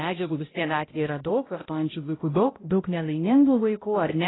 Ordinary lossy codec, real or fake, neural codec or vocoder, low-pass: AAC, 16 kbps; fake; codec, 16 kHz, 0.5 kbps, X-Codec, HuBERT features, trained on balanced general audio; 7.2 kHz